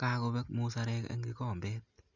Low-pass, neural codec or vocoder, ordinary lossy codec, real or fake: 7.2 kHz; none; Opus, 64 kbps; real